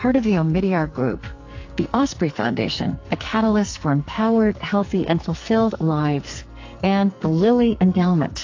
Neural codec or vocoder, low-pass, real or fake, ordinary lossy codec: codec, 44.1 kHz, 2.6 kbps, SNAC; 7.2 kHz; fake; AAC, 48 kbps